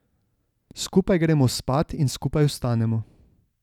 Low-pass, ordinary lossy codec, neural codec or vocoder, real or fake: 19.8 kHz; none; none; real